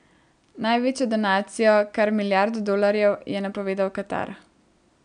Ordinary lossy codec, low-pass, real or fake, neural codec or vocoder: none; 9.9 kHz; real; none